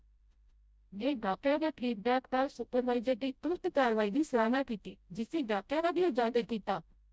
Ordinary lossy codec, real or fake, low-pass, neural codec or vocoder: none; fake; none; codec, 16 kHz, 0.5 kbps, FreqCodec, smaller model